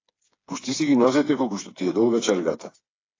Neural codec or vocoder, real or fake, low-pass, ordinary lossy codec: codec, 16 kHz, 4 kbps, FreqCodec, smaller model; fake; 7.2 kHz; AAC, 32 kbps